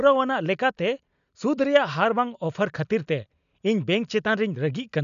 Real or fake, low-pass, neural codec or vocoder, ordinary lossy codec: real; 7.2 kHz; none; none